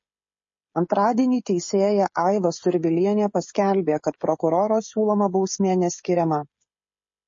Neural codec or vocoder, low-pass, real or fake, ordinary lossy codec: codec, 16 kHz, 16 kbps, FreqCodec, smaller model; 7.2 kHz; fake; MP3, 32 kbps